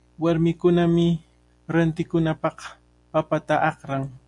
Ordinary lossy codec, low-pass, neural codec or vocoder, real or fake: AAC, 64 kbps; 10.8 kHz; none; real